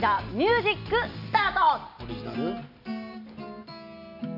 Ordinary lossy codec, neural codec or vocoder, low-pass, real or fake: none; none; 5.4 kHz; real